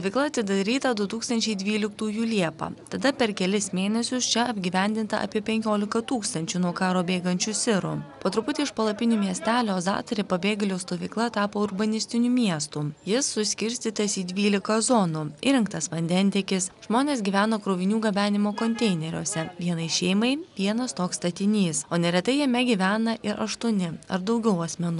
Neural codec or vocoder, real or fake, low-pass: none; real; 10.8 kHz